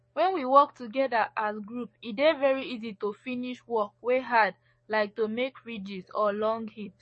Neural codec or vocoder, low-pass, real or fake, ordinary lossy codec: codec, 44.1 kHz, 7.8 kbps, DAC; 10.8 kHz; fake; MP3, 32 kbps